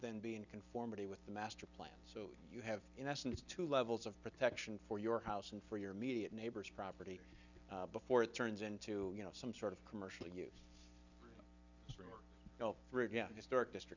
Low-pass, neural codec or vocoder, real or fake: 7.2 kHz; none; real